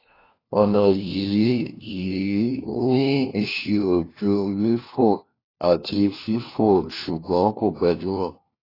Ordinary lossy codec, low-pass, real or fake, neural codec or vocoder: AAC, 24 kbps; 5.4 kHz; fake; codec, 16 kHz, 1 kbps, FunCodec, trained on LibriTTS, 50 frames a second